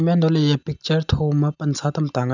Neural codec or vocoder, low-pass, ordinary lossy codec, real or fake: none; 7.2 kHz; none; real